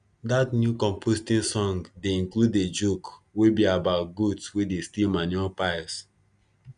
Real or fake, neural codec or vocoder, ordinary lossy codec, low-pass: real; none; none; 9.9 kHz